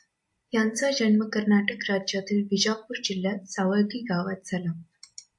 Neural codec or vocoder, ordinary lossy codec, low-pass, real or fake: none; AAC, 64 kbps; 10.8 kHz; real